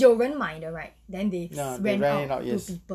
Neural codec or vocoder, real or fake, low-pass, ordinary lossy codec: none; real; 14.4 kHz; none